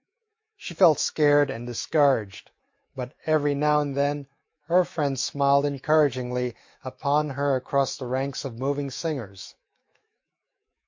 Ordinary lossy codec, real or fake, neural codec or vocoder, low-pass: MP3, 48 kbps; fake; autoencoder, 48 kHz, 128 numbers a frame, DAC-VAE, trained on Japanese speech; 7.2 kHz